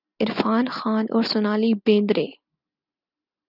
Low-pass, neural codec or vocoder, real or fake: 5.4 kHz; none; real